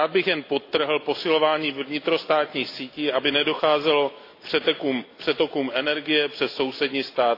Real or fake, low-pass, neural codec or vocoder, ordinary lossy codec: real; 5.4 kHz; none; AAC, 32 kbps